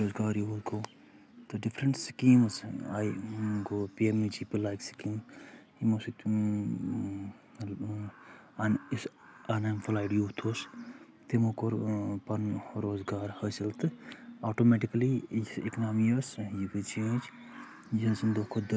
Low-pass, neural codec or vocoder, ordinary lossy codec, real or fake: none; none; none; real